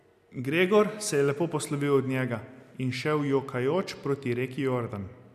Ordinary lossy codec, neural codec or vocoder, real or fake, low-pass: none; none; real; 14.4 kHz